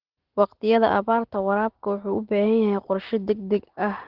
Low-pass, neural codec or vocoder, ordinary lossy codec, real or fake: 5.4 kHz; none; Opus, 16 kbps; real